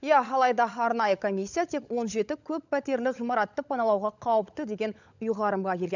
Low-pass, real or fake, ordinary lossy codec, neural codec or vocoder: 7.2 kHz; fake; none; codec, 16 kHz, 16 kbps, FunCodec, trained on LibriTTS, 50 frames a second